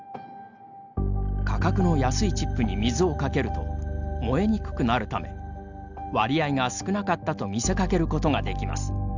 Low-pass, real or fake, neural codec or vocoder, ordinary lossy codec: 7.2 kHz; real; none; Opus, 64 kbps